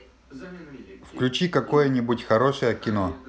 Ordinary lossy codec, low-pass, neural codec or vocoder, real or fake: none; none; none; real